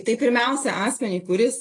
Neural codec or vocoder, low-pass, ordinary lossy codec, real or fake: none; 10.8 kHz; AAC, 32 kbps; real